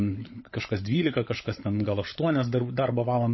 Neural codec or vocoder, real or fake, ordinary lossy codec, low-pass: codec, 16 kHz, 16 kbps, FunCodec, trained on LibriTTS, 50 frames a second; fake; MP3, 24 kbps; 7.2 kHz